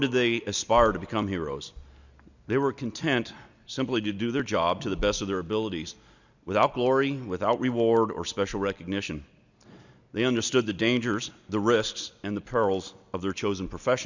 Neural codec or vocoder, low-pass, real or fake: none; 7.2 kHz; real